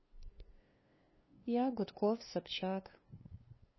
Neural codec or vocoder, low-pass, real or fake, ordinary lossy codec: codec, 16 kHz, 2 kbps, FunCodec, trained on LibriTTS, 25 frames a second; 7.2 kHz; fake; MP3, 24 kbps